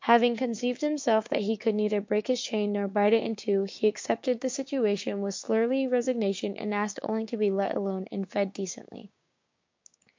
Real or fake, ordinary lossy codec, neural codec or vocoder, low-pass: fake; MP3, 48 kbps; codec, 16 kHz, 6 kbps, DAC; 7.2 kHz